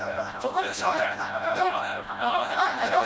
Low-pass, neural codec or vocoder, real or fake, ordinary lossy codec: none; codec, 16 kHz, 0.5 kbps, FreqCodec, smaller model; fake; none